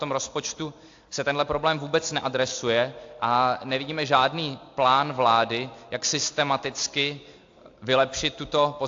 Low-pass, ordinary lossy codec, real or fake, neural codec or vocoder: 7.2 kHz; AAC, 48 kbps; real; none